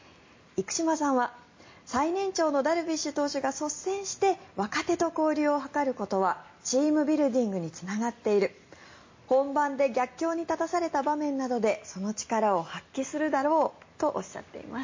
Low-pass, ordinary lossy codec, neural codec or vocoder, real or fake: 7.2 kHz; MP3, 32 kbps; none; real